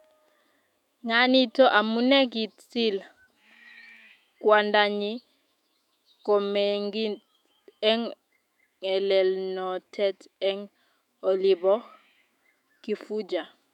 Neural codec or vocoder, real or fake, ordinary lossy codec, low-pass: autoencoder, 48 kHz, 128 numbers a frame, DAC-VAE, trained on Japanese speech; fake; none; 19.8 kHz